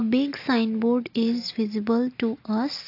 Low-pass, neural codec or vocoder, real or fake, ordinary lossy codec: 5.4 kHz; none; real; AAC, 32 kbps